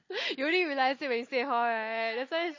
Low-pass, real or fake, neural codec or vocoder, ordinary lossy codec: 7.2 kHz; real; none; MP3, 32 kbps